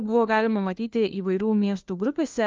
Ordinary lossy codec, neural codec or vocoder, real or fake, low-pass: Opus, 24 kbps; codec, 16 kHz, 1 kbps, FunCodec, trained on LibriTTS, 50 frames a second; fake; 7.2 kHz